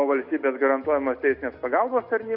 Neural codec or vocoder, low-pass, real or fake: none; 5.4 kHz; real